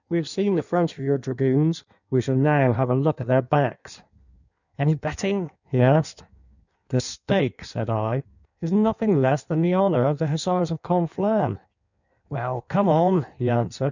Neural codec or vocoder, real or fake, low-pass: codec, 16 kHz in and 24 kHz out, 1.1 kbps, FireRedTTS-2 codec; fake; 7.2 kHz